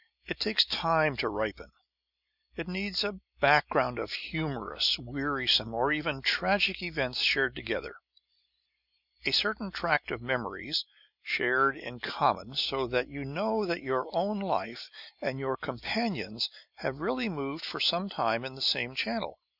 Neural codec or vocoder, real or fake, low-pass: none; real; 5.4 kHz